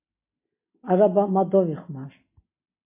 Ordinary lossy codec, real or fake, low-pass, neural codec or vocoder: MP3, 24 kbps; real; 3.6 kHz; none